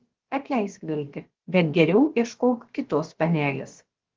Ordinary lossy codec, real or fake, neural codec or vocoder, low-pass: Opus, 16 kbps; fake; codec, 16 kHz, about 1 kbps, DyCAST, with the encoder's durations; 7.2 kHz